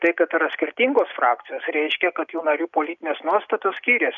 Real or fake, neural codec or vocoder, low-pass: real; none; 7.2 kHz